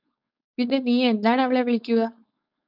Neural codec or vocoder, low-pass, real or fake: codec, 16 kHz, 4.8 kbps, FACodec; 5.4 kHz; fake